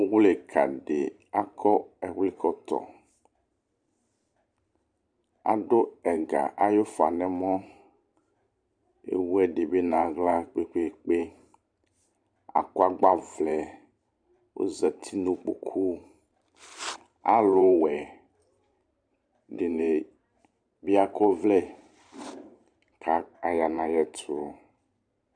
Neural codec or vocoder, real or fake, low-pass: vocoder, 44.1 kHz, 128 mel bands every 512 samples, BigVGAN v2; fake; 9.9 kHz